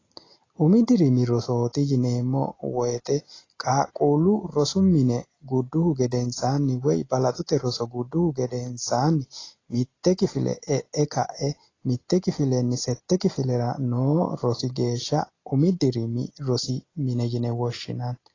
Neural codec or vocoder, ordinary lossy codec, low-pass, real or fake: none; AAC, 32 kbps; 7.2 kHz; real